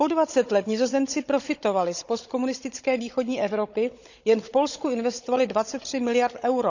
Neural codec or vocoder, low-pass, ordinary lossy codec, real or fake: codec, 16 kHz, 16 kbps, FunCodec, trained on Chinese and English, 50 frames a second; 7.2 kHz; none; fake